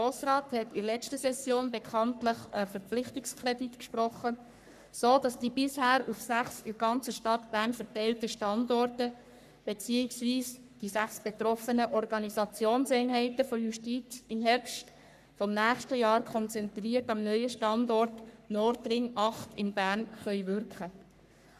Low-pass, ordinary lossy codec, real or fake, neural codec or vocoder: 14.4 kHz; none; fake; codec, 44.1 kHz, 3.4 kbps, Pupu-Codec